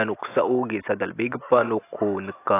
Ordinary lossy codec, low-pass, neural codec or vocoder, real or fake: AAC, 24 kbps; 3.6 kHz; none; real